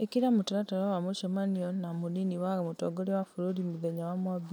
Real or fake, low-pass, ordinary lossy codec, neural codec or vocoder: real; none; none; none